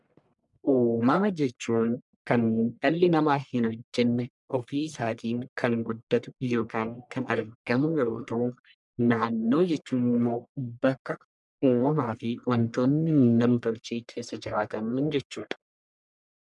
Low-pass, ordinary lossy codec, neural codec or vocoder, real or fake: 10.8 kHz; MP3, 96 kbps; codec, 44.1 kHz, 1.7 kbps, Pupu-Codec; fake